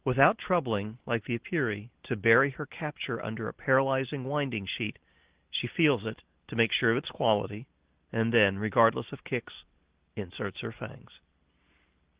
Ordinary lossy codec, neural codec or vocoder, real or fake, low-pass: Opus, 16 kbps; none; real; 3.6 kHz